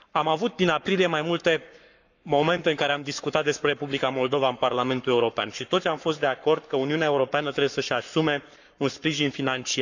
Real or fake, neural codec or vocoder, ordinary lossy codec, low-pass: fake; codec, 44.1 kHz, 7.8 kbps, Pupu-Codec; none; 7.2 kHz